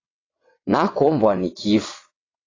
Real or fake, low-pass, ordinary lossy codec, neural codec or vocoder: fake; 7.2 kHz; AAC, 32 kbps; vocoder, 22.05 kHz, 80 mel bands, WaveNeXt